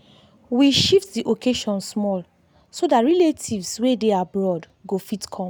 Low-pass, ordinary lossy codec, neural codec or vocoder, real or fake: 19.8 kHz; none; none; real